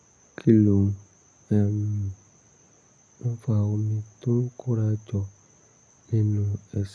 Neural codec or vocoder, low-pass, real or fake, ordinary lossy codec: none; none; real; none